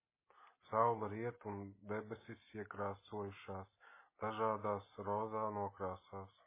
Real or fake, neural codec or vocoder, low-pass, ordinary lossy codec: real; none; 3.6 kHz; MP3, 16 kbps